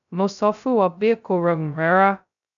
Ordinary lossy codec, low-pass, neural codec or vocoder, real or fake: none; 7.2 kHz; codec, 16 kHz, 0.2 kbps, FocalCodec; fake